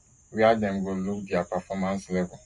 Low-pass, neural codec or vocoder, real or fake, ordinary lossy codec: 14.4 kHz; none; real; MP3, 48 kbps